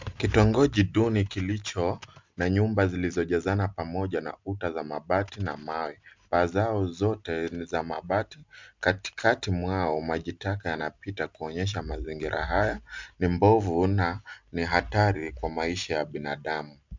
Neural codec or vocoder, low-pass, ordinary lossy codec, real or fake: none; 7.2 kHz; MP3, 64 kbps; real